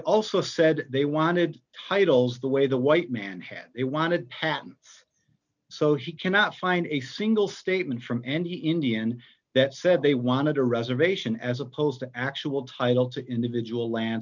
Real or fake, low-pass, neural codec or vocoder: real; 7.2 kHz; none